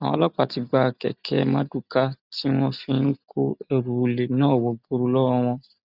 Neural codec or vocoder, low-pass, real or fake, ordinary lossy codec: none; 5.4 kHz; real; none